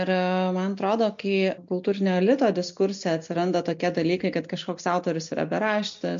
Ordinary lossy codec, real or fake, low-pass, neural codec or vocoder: MP3, 48 kbps; real; 7.2 kHz; none